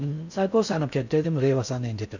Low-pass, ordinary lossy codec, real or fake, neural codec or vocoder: 7.2 kHz; none; fake; codec, 16 kHz in and 24 kHz out, 0.6 kbps, FocalCodec, streaming, 4096 codes